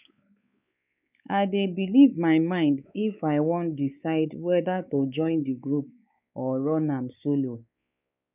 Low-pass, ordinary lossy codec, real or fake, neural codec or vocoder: 3.6 kHz; none; fake; codec, 16 kHz, 4 kbps, X-Codec, WavLM features, trained on Multilingual LibriSpeech